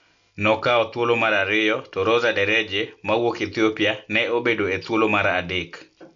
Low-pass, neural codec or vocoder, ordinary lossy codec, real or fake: 7.2 kHz; none; AAC, 64 kbps; real